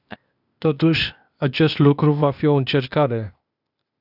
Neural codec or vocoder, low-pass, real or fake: codec, 16 kHz, 0.8 kbps, ZipCodec; 5.4 kHz; fake